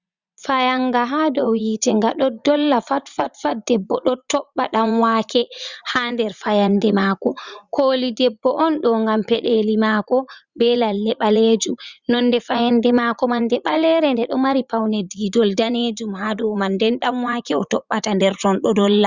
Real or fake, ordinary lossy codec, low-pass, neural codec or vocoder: fake; Opus, 64 kbps; 7.2 kHz; vocoder, 44.1 kHz, 80 mel bands, Vocos